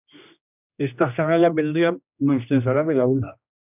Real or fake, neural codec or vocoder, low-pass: fake; codec, 16 kHz, 1 kbps, X-Codec, HuBERT features, trained on general audio; 3.6 kHz